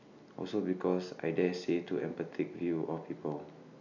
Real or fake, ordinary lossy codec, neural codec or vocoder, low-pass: real; none; none; 7.2 kHz